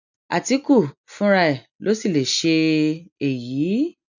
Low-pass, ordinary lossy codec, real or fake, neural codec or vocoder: 7.2 kHz; none; real; none